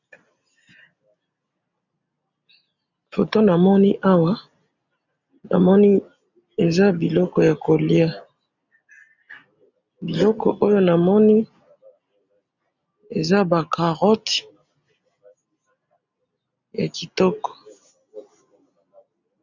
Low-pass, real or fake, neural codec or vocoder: 7.2 kHz; real; none